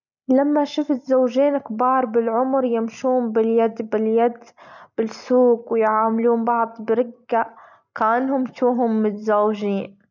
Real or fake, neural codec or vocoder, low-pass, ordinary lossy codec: real; none; 7.2 kHz; none